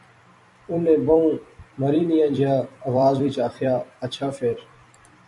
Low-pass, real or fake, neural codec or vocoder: 10.8 kHz; fake; vocoder, 44.1 kHz, 128 mel bands every 256 samples, BigVGAN v2